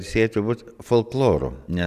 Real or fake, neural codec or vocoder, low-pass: real; none; 14.4 kHz